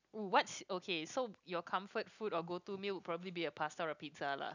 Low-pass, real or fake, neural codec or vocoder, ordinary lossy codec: 7.2 kHz; real; none; none